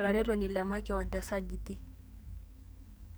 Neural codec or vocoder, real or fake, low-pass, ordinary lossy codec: codec, 44.1 kHz, 2.6 kbps, SNAC; fake; none; none